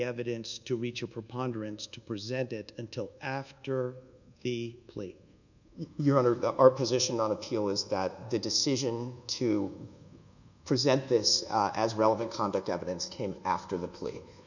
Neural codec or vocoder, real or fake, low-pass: codec, 24 kHz, 1.2 kbps, DualCodec; fake; 7.2 kHz